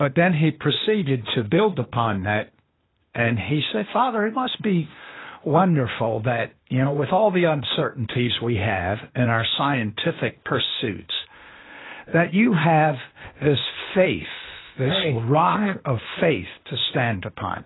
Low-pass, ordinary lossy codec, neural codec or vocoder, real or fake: 7.2 kHz; AAC, 16 kbps; codec, 16 kHz, 0.8 kbps, ZipCodec; fake